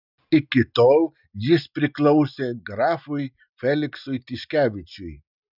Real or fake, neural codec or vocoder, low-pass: real; none; 5.4 kHz